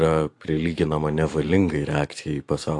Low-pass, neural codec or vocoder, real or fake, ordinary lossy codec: 10.8 kHz; vocoder, 44.1 kHz, 128 mel bands every 512 samples, BigVGAN v2; fake; AAC, 48 kbps